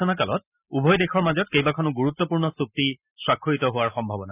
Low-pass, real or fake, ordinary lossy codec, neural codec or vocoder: 3.6 kHz; real; none; none